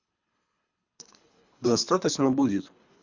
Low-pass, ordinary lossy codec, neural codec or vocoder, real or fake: 7.2 kHz; Opus, 64 kbps; codec, 24 kHz, 3 kbps, HILCodec; fake